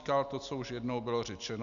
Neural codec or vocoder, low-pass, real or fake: none; 7.2 kHz; real